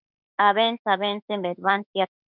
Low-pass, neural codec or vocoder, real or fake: 5.4 kHz; autoencoder, 48 kHz, 32 numbers a frame, DAC-VAE, trained on Japanese speech; fake